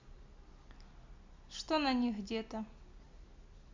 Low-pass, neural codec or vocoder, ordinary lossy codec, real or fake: 7.2 kHz; none; MP3, 64 kbps; real